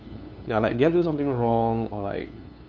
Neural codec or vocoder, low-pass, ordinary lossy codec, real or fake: codec, 16 kHz, 2 kbps, FunCodec, trained on LibriTTS, 25 frames a second; none; none; fake